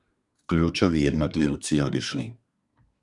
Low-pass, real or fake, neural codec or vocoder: 10.8 kHz; fake; codec, 24 kHz, 1 kbps, SNAC